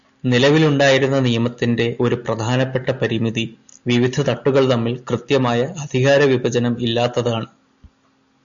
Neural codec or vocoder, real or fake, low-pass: none; real; 7.2 kHz